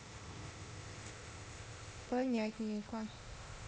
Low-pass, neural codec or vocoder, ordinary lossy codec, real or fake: none; codec, 16 kHz, 0.8 kbps, ZipCodec; none; fake